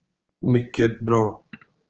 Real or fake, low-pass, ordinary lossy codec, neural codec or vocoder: fake; 7.2 kHz; Opus, 16 kbps; codec, 16 kHz, 4 kbps, X-Codec, HuBERT features, trained on general audio